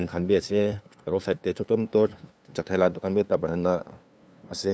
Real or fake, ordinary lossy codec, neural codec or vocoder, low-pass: fake; none; codec, 16 kHz, 2 kbps, FunCodec, trained on LibriTTS, 25 frames a second; none